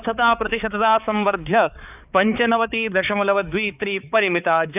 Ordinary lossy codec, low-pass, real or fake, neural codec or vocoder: none; 3.6 kHz; fake; codec, 16 kHz, 4 kbps, X-Codec, HuBERT features, trained on balanced general audio